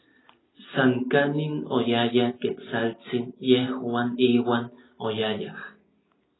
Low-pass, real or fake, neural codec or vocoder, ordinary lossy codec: 7.2 kHz; real; none; AAC, 16 kbps